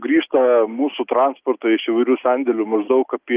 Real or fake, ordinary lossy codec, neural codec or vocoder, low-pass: real; Opus, 32 kbps; none; 3.6 kHz